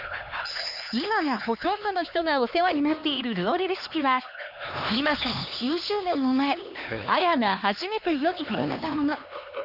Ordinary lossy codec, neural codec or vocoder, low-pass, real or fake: none; codec, 16 kHz, 2 kbps, X-Codec, HuBERT features, trained on LibriSpeech; 5.4 kHz; fake